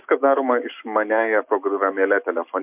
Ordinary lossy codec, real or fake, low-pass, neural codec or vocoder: MP3, 32 kbps; real; 3.6 kHz; none